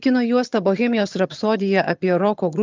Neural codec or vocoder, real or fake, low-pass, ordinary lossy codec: vocoder, 22.05 kHz, 80 mel bands, HiFi-GAN; fake; 7.2 kHz; Opus, 24 kbps